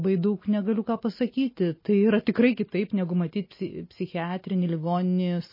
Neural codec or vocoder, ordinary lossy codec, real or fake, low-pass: none; MP3, 24 kbps; real; 5.4 kHz